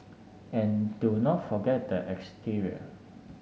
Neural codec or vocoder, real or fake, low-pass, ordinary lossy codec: none; real; none; none